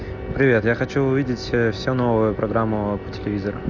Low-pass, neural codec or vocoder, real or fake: 7.2 kHz; none; real